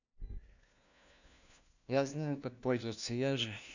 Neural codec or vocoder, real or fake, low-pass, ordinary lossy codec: codec, 16 kHz, 1 kbps, FunCodec, trained on LibriTTS, 50 frames a second; fake; 7.2 kHz; none